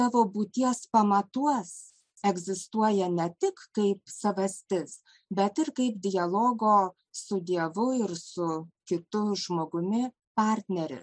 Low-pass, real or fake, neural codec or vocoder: 9.9 kHz; real; none